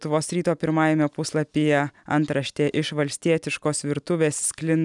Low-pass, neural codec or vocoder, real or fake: 10.8 kHz; none; real